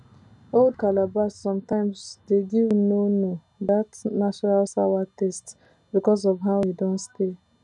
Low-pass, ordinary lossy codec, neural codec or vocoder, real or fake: 10.8 kHz; none; none; real